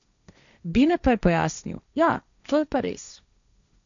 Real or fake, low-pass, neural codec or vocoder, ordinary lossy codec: fake; 7.2 kHz; codec, 16 kHz, 1.1 kbps, Voila-Tokenizer; none